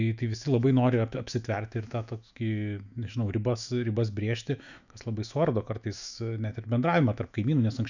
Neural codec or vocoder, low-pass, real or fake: autoencoder, 48 kHz, 128 numbers a frame, DAC-VAE, trained on Japanese speech; 7.2 kHz; fake